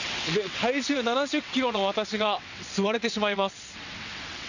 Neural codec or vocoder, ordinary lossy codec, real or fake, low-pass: vocoder, 22.05 kHz, 80 mel bands, WaveNeXt; none; fake; 7.2 kHz